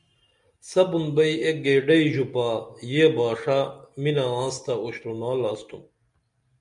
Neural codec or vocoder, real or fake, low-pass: none; real; 10.8 kHz